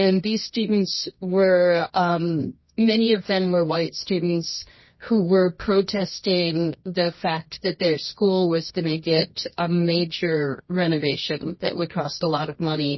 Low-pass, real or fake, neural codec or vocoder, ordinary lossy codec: 7.2 kHz; fake; codec, 24 kHz, 0.9 kbps, WavTokenizer, medium music audio release; MP3, 24 kbps